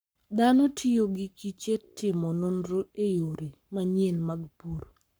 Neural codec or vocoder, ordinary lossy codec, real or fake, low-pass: codec, 44.1 kHz, 7.8 kbps, Pupu-Codec; none; fake; none